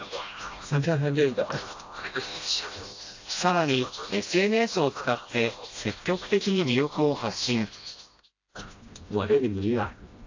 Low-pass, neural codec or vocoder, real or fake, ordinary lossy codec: 7.2 kHz; codec, 16 kHz, 1 kbps, FreqCodec, smaller model; fake; AAC, 48 kbps